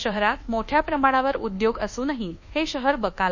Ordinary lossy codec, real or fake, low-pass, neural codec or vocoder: none; fake; 7.2 kHz; codec, 24 kHz, 1.2 kbps, DualCodec